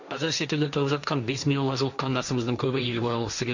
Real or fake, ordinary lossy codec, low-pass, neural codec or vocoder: fake; none; 7.2 kHz; codec, 16 kHz, 1.1 kbps, Voila-Tokenizer